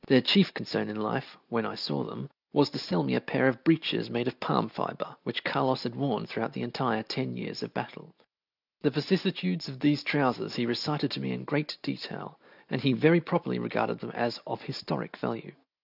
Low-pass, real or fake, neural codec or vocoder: 5.4 kHz; real; none